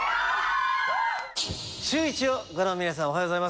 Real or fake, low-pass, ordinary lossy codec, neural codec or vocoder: real; none; none; none